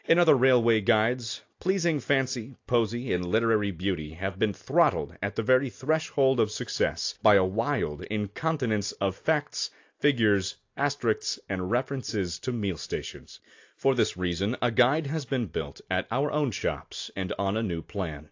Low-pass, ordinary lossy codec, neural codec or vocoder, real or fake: 7.2 kHz; AAC, 48 kbps; none; real